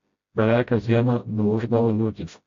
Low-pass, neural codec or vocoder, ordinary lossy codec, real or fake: 7.2 kHz; codec, 16 kHz, 0.5 kbps, FreqCodec, smaller model; AAC, 48 kbps; fake